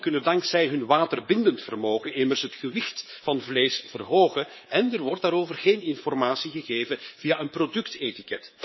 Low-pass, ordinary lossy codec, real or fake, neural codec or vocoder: 7.2 kHz; MP3, 24 kbps; fake; codec, 24 kHz, 3.1 kbps, DualCodec